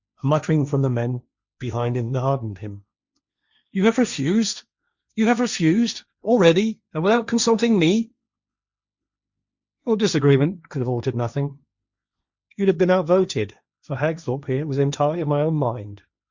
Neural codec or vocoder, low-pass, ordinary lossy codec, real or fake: codec, 16 kHz, 1.1 kbps, Voila-Tokenizer; 7.2 kHz; Opus, 64 kbps; fake